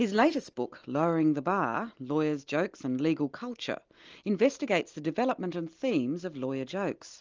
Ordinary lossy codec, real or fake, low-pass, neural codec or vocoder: Opus, 24 kbps; real; 7.2 kHz; none